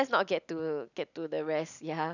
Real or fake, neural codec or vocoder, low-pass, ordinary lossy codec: real; none; 7.2 kHz; none